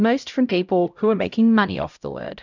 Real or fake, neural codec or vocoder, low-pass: fake; codec, 16 kHz, 0.5 kbps, X-Codec, HuBERT features, trained on LibriSpeech; 7.2 kHz